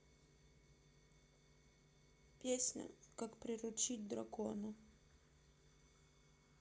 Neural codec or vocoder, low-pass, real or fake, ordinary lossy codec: none; none; real; none